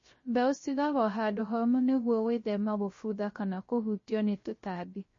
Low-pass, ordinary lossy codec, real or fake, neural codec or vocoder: 7.2 kHz; MP3, 32 kbps; fake; codec, 16 kHz, 0.3 kbps, FocalCodec